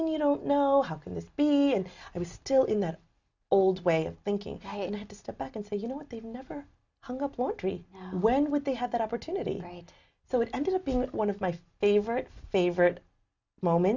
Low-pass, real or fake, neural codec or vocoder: 7.2 kHz; real; none